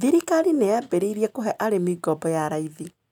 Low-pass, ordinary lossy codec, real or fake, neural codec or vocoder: 19.8 kHz; none; real; none